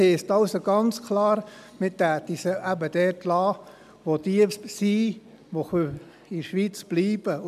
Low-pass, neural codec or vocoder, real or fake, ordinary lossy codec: 14.4 kHz; none; real; none